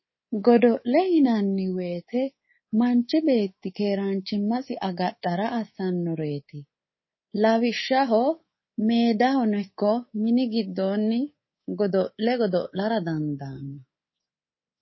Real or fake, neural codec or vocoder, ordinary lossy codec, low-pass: fake; codec, 24 kHz, 3.1 kbps, DualCodec; MP3, 24 kbps; 7.2 kHz